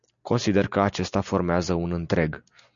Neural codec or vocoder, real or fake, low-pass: none; real; 7.2 kHz